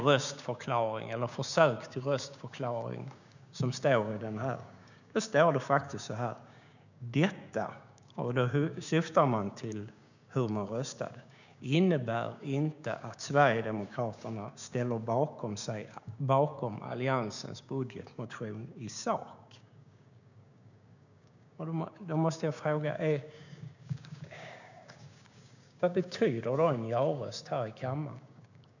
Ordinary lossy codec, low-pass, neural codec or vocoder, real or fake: none; 7.2 kHz; codec, 16 kHz, 6 kbps, DAC; fake